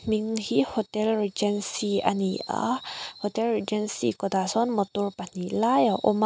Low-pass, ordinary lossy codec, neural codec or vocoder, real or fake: none; none; none; real